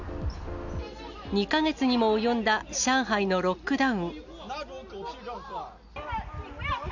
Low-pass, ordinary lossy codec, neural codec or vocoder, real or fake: 7.2 kHz; none; none; real